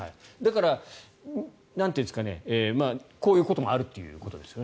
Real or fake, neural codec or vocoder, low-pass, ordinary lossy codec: real; none; none; none